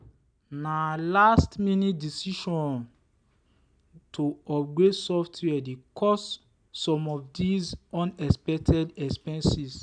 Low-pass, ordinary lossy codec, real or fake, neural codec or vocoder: 9.9 kHz; none; real; none